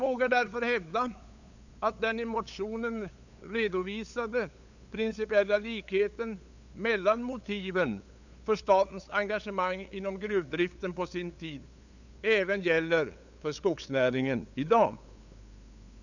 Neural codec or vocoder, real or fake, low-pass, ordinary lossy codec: codec, 16 kHz, 8 kbps, FunCodec, trained on LibriTTS, 25 frames a second; fake; 7.2 kHz; none